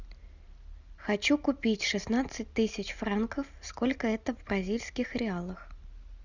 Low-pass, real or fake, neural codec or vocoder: 7.2 kHz; real; none